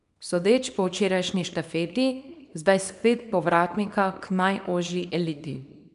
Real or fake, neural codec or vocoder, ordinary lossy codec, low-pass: fake; codec, 24 kHz, 0.9 kbps, WavTokenizer, small release; none; 10.8 kHz